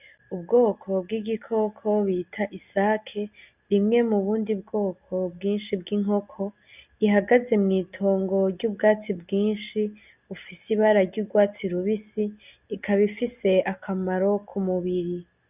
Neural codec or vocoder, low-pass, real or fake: none; 3.6 kHz; real